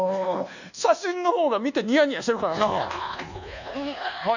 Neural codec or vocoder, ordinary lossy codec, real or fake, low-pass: codec, 24 kHz, 1.2 kbps, DualCodec; none; fake; 7.2 kHz